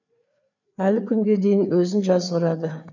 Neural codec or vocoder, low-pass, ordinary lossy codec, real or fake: codec, 16 kHz, 4 kbps, FreqCodec, larger model; 7.2 kHz; none; fake